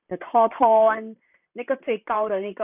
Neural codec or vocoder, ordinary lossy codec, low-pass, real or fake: vocoder, 44.1 kHz, 128 mel bands, Pupu-Vocoder; none; 3.6 kHz; fake